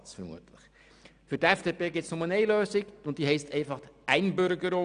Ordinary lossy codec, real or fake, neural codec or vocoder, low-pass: none; real; none; 9.9 kHz